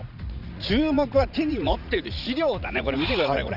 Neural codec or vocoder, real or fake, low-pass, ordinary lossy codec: vocoder, 44.1 kHz, 80 mel bands, Vocos; fake; 5.4 kHz; none